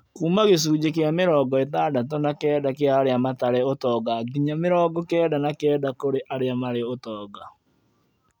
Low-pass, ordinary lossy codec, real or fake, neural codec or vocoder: 19.8 kHz; none; real; none